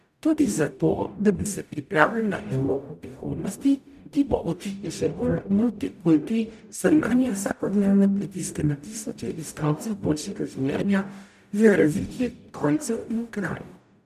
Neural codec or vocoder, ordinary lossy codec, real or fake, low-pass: codec, 44.1 kHz, 0.9 kbps, DAC; none; fake; 14.4 kHz